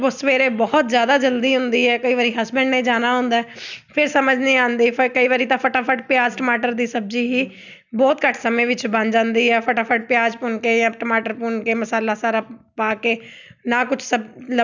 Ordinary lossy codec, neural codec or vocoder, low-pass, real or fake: none; none; 7.2 kHz; real